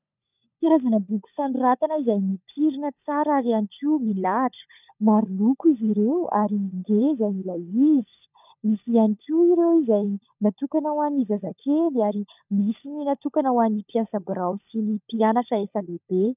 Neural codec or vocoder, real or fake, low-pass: codec, 16 kHz, 16 kbps, FunCodec, trained on LibriTTS, 50 frames a second; fake; 3.6 kHz